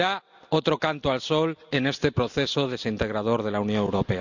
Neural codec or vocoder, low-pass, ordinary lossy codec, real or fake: none; 7.2 kHz; none; real